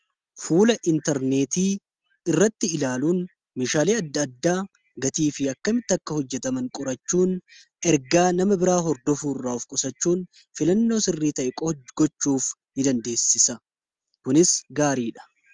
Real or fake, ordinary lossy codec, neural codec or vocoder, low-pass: real; Opus, 32 kbps; none; 9.9 kHz